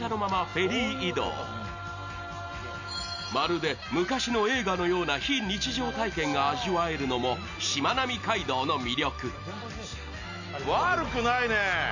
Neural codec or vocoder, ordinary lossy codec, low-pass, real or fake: none; none; 7.2 kHz; real